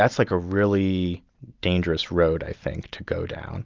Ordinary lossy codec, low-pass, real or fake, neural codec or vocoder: Opus, 32 kbps; 7.2 kHz; real; none